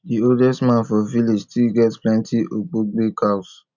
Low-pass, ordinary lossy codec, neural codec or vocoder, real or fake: 7.2 kHz; none; none; real